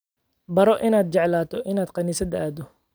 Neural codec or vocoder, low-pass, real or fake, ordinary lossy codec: none; none; real; none